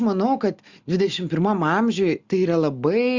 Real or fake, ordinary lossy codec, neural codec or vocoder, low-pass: real; Opus, 64 kbps; none; 7.2 kHz